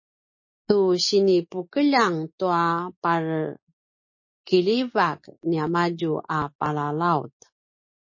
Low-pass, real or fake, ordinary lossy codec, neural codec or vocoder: 7.2 kHz; real; MP3, 32 kbps; none